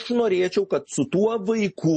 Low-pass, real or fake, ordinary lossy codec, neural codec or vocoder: 10.8 kHz; real; MP3, 32 kbps; none